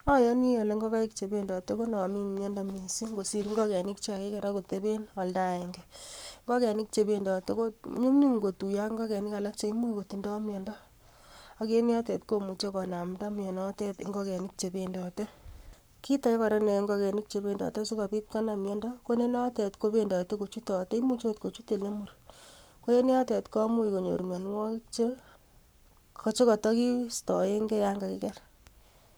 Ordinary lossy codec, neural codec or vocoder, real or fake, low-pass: none; codec, 44.1 kHz, 7.8 kbps, Pupu-Codec; fake; none